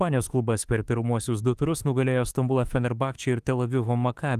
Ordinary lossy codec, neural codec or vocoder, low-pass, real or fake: Opus, 24 kbps; autoencoder, 48 kHz, 32 numbers a frame, DAC-VAE, trained on Japanese speech; 14.4 kHz; fake